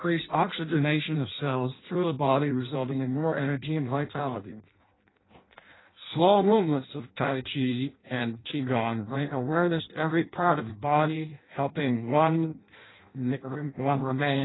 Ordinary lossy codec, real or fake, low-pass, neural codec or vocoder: AAC, 16 kbps; fake; 7.2 kHz; codec, 16 kHz in and 24 kHz out, 0.6 kbps, FireRedTTS-2 codec